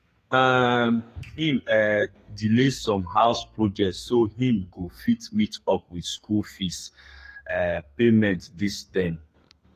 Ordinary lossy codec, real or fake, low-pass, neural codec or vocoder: AAC, 48 kbps; fake; 14.4 kHz; codec, 32 kHz, 1.9 kbps, SNAC